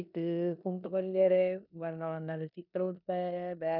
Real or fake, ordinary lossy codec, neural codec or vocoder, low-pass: fake; none; codec, 16 kHz in and 24 kHz out, 0.9 kbps, LongCat-Audio-Codec, four codebook decoder; 5.4 kHz